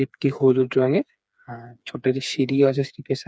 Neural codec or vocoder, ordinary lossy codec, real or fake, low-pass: codec, 16 kHz, 4 kbps, FreqCodec, smaller model; none; fake; none